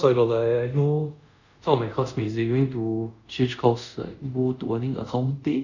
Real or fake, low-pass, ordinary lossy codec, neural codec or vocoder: fake; 7.2 kHz; none; codec, 24 kHz, 0.5 kbps, DualCodec